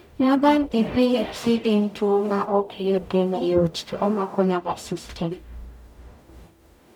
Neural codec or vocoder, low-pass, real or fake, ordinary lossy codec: codec, 44.1 kHz, 0.9 kbps, DAC; 19.8 kHz; fake; none